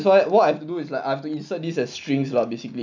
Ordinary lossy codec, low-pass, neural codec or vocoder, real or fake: none; 7.2 kHz; none; real